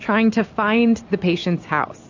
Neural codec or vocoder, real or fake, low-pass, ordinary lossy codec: none; real; 7.2 kHz; AAC, 48 kbps